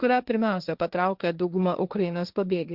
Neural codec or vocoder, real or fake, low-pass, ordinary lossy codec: codec, 16 kHz, 1.1 kbps, Voila-Tokenizer; fake; 5.4 kHz; Opus, 64 kbps